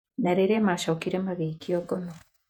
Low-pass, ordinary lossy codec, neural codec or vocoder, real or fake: 19.8 kHz; none; vocoder, 48 kHz, 128 mel bands, Vocos; fake